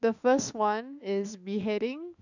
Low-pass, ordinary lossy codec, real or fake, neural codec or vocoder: 7.2 kHz; none; fake; autoencoder, 48 kHz, 32 numbers a frame, DAC-VAE, trained on Japanese speech